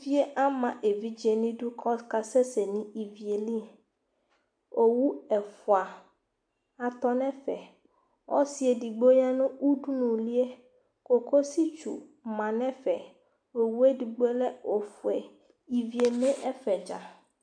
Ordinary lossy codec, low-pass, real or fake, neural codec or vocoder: AAC, 64 kbps; 9.9 kHz; real; none